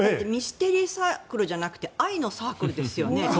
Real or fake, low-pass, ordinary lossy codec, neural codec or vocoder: real; none; none; none